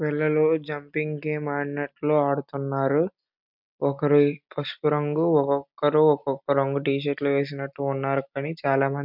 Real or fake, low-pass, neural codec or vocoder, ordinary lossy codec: real; 5.4 kHz; none; MP3, 48 kbps